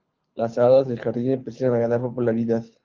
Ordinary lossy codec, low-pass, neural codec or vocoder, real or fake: Opus, 24 kbps; 7.2 kHz; codec, 24 kHz, 6 kbps, HILCodec; fake